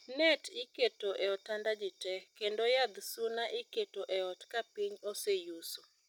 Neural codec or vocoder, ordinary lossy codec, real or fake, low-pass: none; none; real; 19.8 kHz